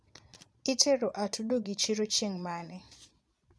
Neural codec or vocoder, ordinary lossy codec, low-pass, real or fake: vocoder, 22.05 kHz, 80 mel bands, Vocos; none; none; fake